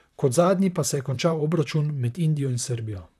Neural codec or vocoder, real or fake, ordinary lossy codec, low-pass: vocoder, 44.1 kHz, 128 mel bands, Pupu-Vocoder; fake; none; 14.4 kHz